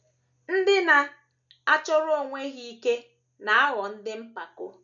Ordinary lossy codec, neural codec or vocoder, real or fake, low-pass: none; none; real; 7.2 kHz